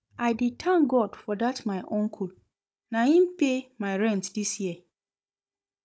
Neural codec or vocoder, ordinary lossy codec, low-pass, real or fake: codec, 16 kHz, 16 kbps, FunCodec, trained on Chinese and English, 50 frames a second; none; none; fake